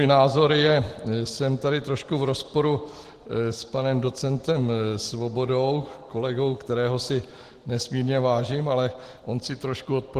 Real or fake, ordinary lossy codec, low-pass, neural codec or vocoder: real; Opus, 16 kbps; 14.4 kHz; none